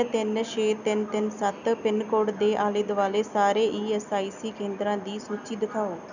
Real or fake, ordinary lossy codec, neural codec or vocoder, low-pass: real; none; none; 7.2 kHz